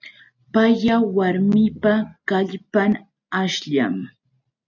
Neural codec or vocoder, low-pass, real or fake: none; 7.2 kHz; real